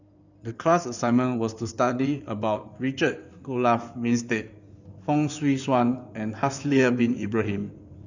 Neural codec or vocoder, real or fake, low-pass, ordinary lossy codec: codec, 16 kHz in and 24 kHz out, 2.2 kbps, FireRedTTS-2 codec; fake; 7.2 kHz; none